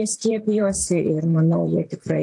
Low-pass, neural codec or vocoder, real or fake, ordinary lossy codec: 10.8 kHz; vocoder, 44.1 kHz, 128 mel bands, Pupu-Vocoder; fake; AAC, 64 kbps